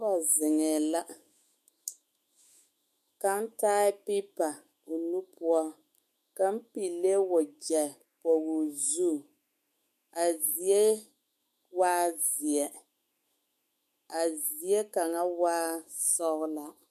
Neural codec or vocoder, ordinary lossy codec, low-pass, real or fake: autoencoder, 48 kHz, 128 numbers a frame, DAC-VAE, trained on Japanese speech; MP3, 64 kbps; 14.4 kHz; fake